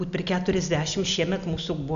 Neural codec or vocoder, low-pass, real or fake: none; 7.2 kHz; real